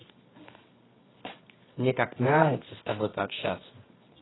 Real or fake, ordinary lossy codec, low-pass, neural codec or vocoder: fake; AAC, 16 kbps; 7.2 kHz; codec, 24 kHz, 0.9 kbps, WavTokenizer, medium music audio release